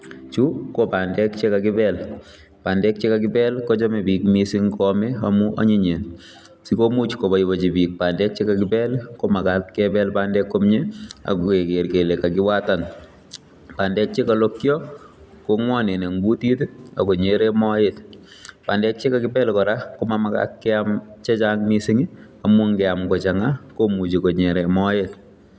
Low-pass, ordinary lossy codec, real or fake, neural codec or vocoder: none; none; real; none